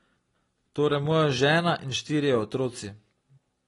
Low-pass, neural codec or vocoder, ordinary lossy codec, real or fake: 10.8 kHz; none; AAC, 32 kbps; real